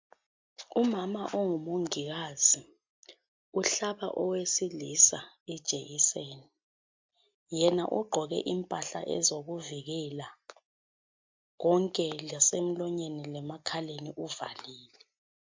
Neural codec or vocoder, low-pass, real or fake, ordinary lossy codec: none; 7.2 kHz; real; MP3, 64 kbps